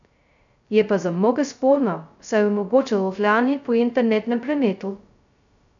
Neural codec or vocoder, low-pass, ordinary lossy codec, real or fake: codec, 16 kHz, 0.2 kbps, FocalCodec; 7.2 kHz; none; fake